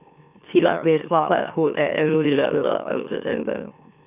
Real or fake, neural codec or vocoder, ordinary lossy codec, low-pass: fake; autoencoder, 44.1 kHz, a latent of 192 numbers a frame, MeloTTS; none; 3.6 kHz